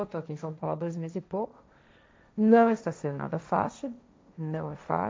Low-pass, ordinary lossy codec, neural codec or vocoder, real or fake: none; none; codec, 16 kHz, 1.1 kbps, Voila-Tokenizer; fake